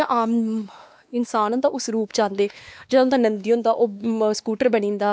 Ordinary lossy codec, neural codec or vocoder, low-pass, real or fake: none; codec, 16 kHz, 2 kbps, X-Codec, WavLM features, trained on Multilingual LibriSpeech; none; fake